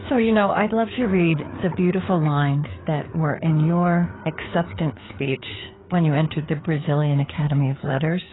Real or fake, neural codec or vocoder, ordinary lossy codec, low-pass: fake; codec, 16 kHz, 4 kbps, FreqCodec, larger model; AAC, 16 kbps; 7.2 kHz